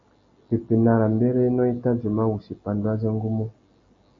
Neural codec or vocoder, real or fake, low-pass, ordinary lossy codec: none; real; 7.2 kHz; AAC, 48 kbps